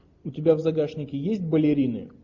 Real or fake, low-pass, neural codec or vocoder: real; 7.2 kHz; none